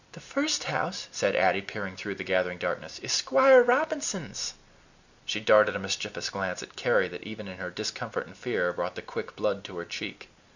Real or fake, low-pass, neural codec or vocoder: real; 7.2 kHz; none